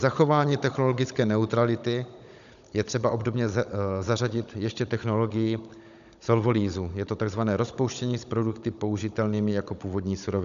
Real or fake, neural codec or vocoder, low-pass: fake; codec, 16 kHz, 16 kbps, FunCodec, trained on Chinese and English, 50 frames a second; 7.2 kHz